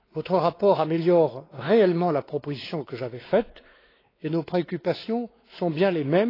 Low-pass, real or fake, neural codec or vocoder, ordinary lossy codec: 5.4 kHz; fake; codec, 16 kHz, 4 kbps, X-Codec, WavLM features, trained on Multilingual LibriSpeech; AAC, 24 kbps